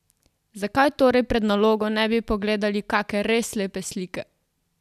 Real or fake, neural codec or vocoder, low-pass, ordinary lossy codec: real; none; 14.4 kHz; none